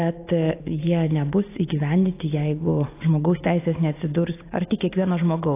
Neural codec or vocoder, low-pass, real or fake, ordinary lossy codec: none; 3.6 kHz; real; AAC, 24 kbps